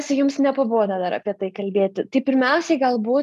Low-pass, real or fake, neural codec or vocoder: 14.4 kHz; real; none